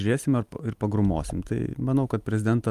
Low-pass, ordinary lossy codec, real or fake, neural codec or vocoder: 14.4 kHz; Opus, 32 kbps; real; none